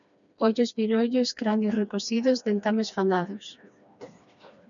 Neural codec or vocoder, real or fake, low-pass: codec, 16 kHz, 2 kbps, FreqCodec, smaller model; fake; 7.2 kHz